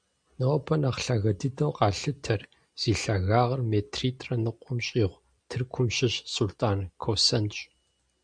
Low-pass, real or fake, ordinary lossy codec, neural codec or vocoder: 9.9 kHz; real; MP3, 96 kbps; none